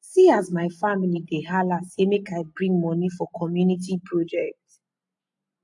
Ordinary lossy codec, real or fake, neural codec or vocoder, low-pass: AAC, 64 kbps; real; none; 10.8 kHz